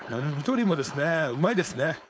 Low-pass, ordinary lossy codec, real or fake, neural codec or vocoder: none; none; fake; codec, 16 kHz, 4.8 kbps, FACodec